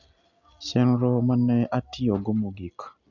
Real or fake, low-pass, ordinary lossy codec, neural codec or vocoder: real; 7.2 kHz; none; none